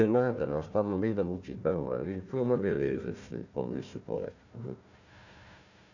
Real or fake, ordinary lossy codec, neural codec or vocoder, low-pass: fake; none; codec, 16 kHz, 1 kbps, FunCodec, trained on Chinese and English, 50 frames a second; 7.2 kHz